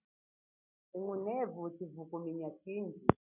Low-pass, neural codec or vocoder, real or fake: 3.6 kHz; none; real